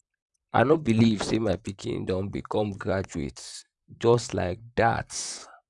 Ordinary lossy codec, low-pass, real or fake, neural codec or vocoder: none; 10.8 kHz; fake; vocoder, 44.1 kHz, 128 mel bands, Pupu-Vocoder